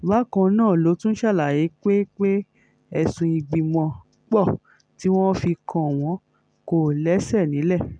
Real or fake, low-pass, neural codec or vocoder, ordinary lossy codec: real; 9.9 kHz; none; none